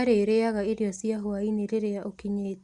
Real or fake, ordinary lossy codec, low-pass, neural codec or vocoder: real; Opus, 64 kbps; 10.8 kHz; none